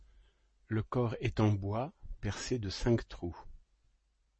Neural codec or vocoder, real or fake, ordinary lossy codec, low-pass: none; real; MP3, 32 kbps; 9.9 kHz